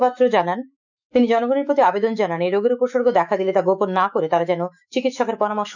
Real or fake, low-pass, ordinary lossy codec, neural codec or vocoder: fake; 7.2 kHz; none; autoencoder, 48 kHz, 128 numbers a frame, DAC-VAE, trained on Japanese speech